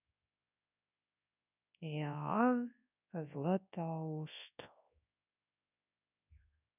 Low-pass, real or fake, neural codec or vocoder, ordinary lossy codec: 3.6 kHz; fake; codec, 24 kHz, 0.9 kbps, WavTokenizer, large speech release; none